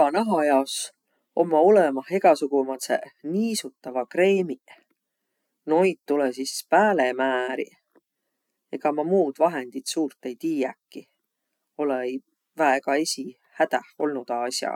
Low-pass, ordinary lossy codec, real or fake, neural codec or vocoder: 19.8 kHz; none; real; none